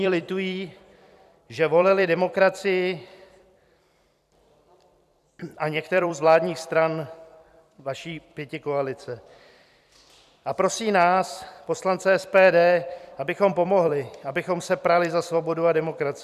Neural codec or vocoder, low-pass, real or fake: vocoder, 44.1 kHz, 128 mel bands every 256 samples, BigVGAN v2; 14.4 kHz; fake